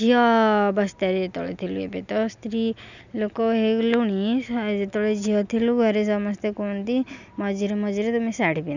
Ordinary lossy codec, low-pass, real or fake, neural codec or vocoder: none; 7.2 kHz; real; none